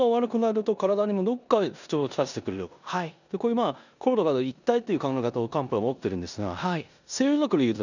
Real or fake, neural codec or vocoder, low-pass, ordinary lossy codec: fake; codec, 16 kHz in and 24 kHz out, 0.9 kbps, LongCat-Audio-Codec, four codebook decoder; 7.2 kHz; none